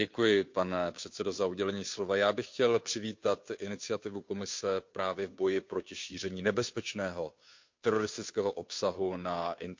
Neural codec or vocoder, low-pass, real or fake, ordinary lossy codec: codec, 16 kHz, 2 kbps, FunCodec, trained on Chinese and English, 25 frames a second; 7.2 kHz; fake; MP3, 48 kbps